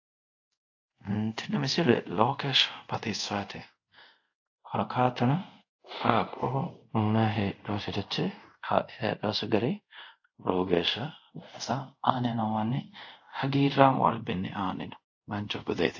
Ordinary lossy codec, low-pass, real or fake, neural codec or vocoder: AAC, 48 kbps; 7.2 kHz; fake; codec, 24 kHz, 0.5 kbps, DualCodec